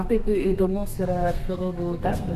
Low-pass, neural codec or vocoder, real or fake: 14.4 kHz; codec, 32 kHz, 1.9 kbps, SNAC; fake